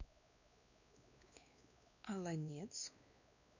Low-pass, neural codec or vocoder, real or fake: 7.2 kHz; codec, 16 kHz, 2 kbps, X-Codec, WavLM features, trained on Multilingual LibriSpeech; fake